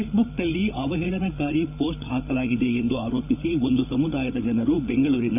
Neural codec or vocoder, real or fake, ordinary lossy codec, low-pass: codec, 16 kHz, 8 kbps, FreqCodec, larger model; fake; none; 3.6 kHz